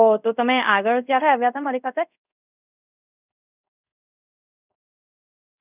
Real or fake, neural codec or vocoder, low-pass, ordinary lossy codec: fake; codec, 24 kHz, 0.5 kbps, DualCodec; 3.6 kHz; none